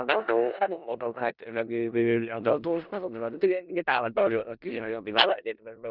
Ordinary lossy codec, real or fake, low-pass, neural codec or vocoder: none; fake; 5.4 kHz; codec, 16 kHz in and 24 kHz out, 0.4 kbps, LongCat-Audio-Codec, four codebook decoder